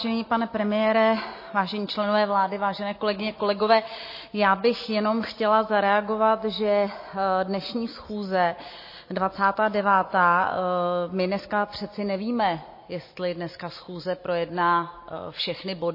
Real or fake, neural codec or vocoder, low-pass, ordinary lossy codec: real; none; 5.4 kHz; MP3, 24 kbps